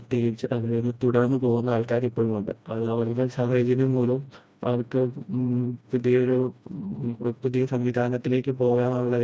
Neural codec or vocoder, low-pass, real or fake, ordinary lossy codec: codec, 16 kHz, 1 kbps, FreqCodec, smaller model; none; fake; none